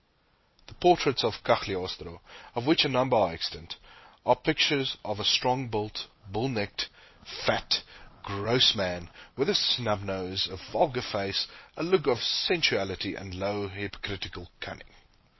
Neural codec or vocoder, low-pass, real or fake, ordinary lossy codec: none; 7.2 kHz; real; MP3, 24 kbps